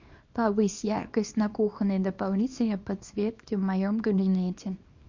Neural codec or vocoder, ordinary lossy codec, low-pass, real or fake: codec, 24 kHz, 0.9 kbps, WavTokenizer, small release; MP3, 48 kbps; 7.2 kHz; fake